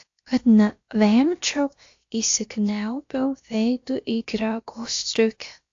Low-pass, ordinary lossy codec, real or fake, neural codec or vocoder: 7.2 kHz; AAC, 48 kbps; fake; codec, 16 kHz, about 1 kbps, DyCAST, with the encoder's durations